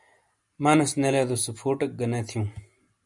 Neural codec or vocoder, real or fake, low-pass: none; real; 10.8 kHz